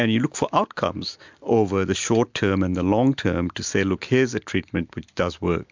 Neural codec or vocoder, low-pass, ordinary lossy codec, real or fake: none; 7.2 kHz; MP3, 64 kbps; real